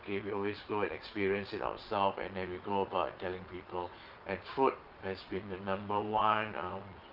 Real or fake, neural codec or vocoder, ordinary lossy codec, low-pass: fake; vocoder, 22.05 kHz, 80 mel bands, Vocos; Opus, 24 kbps; 5.4 kHz